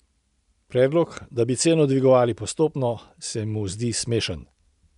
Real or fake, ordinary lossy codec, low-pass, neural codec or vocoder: real; none; 10.8 kHz; none